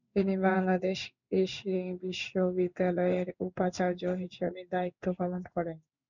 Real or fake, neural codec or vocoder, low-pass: fake; codec, 16 kHz in and 24 kHz out, 1 kbps, XY-Tokenizer; 7.2 kHz